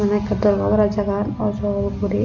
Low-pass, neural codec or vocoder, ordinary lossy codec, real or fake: 7.2 kHz; none; none; real